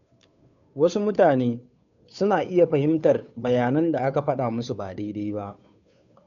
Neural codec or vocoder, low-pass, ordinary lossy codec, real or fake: codec, 16 kHz, 2 kbps, FunCodec, trained on Chinese and English, 25 frames a second; 7.2 kHz; none; fake